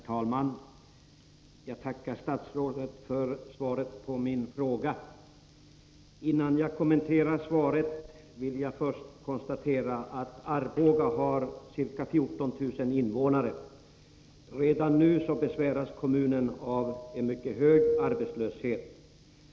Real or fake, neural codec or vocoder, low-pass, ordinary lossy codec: real; none; none; none